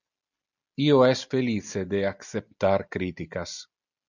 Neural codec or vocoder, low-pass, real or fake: none; 7.2 kHz; real